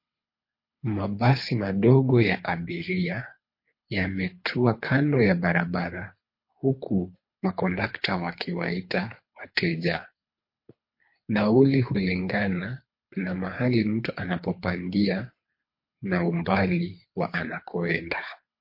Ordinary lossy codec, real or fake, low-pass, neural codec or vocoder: MP3, 32 kbps; fake; 5.4 kHz; codec, 24 kHz, 3 kbps, HILCodec